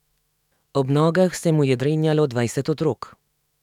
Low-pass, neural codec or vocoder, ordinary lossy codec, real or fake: 19.8 kHz; autoencoder, 48 kHz, 128 numbers a frame, DAC-VAE, trained on Japanese speech; none; fake